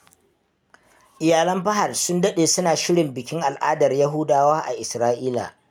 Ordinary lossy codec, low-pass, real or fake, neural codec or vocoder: none; none; real; none